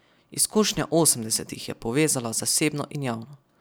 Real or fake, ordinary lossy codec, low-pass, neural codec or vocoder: real; none; none; none